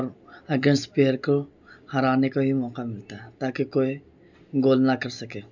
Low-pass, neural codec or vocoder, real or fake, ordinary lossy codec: 7.2 kHz; none; real; none